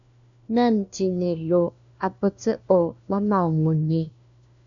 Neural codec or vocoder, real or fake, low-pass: codec, 16 kHz, 1 kbps, FunCodec, trained on LibriTTS, 50 frames a second; fake; 7.2 kHz